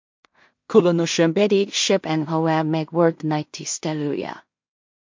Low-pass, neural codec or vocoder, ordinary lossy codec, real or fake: 7.2 kHz; codec, 16 kHz in and 24 kHz out, 0.4 kbps, LongCat-Audio-Codec, two codebook decoder; MP3, 48 kbps; fake